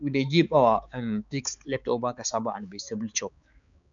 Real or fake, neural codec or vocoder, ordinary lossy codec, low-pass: fake; codec, 16 kHz, 4 kbps, X-Codec, HuBERT features, trained on balanced general audio; none; 7.2 kHz